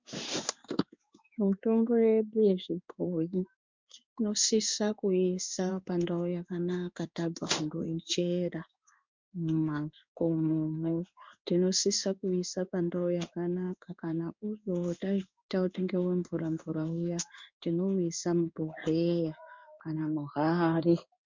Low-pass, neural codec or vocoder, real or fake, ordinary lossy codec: 7.2 kHz; codec, 16 kHz in and 24 kHz out, 1 kbps, XY-Tokenizer; fake; MP3, 64 kbps